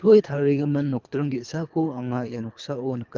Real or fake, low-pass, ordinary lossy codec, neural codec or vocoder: fake; 7.2 kHz; Opus, 32 kbps; codec, 24 kHz, 3 kbps, HILCodec